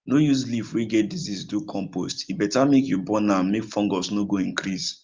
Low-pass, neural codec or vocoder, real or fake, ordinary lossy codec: 7.2 kHz; none; real; Opus, 24 kbps